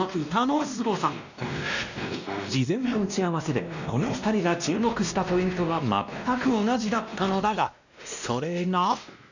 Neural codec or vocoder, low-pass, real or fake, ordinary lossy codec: codec, 16 kHz, 1 kbps, X-Codec, WavLM features, trained on Multilingual LibriSpeech; 7.2 kHz; fake; none